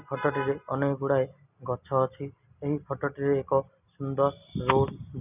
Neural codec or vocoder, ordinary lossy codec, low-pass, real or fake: none; none; 3.6 kHz; real